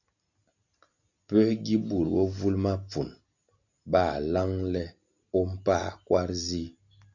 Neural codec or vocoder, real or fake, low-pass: none; real; 7.2 kHz